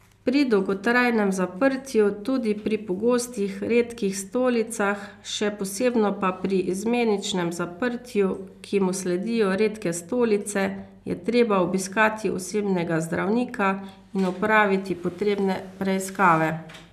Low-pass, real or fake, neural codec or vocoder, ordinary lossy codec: 14.4 kHz; real; none; none